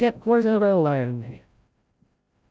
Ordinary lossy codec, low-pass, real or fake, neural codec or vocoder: none; none; fake; codec, 16 kHz, 0.5 kbps, FreqCodec, larger model